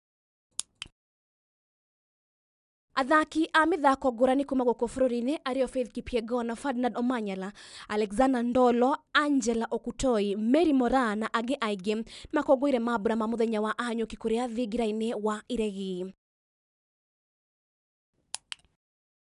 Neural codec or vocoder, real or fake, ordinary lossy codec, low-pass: none; real; none; 10.8 kHz